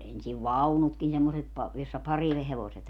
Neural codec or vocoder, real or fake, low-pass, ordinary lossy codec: none; real; 19.8 kHz; none